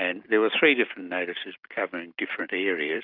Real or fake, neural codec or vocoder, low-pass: real; none; 5.4 kHz